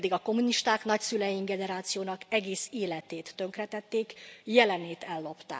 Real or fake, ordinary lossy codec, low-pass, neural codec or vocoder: real; none; none; none